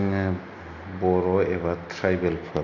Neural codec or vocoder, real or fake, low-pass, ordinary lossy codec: none; real; 7.2 kHz; none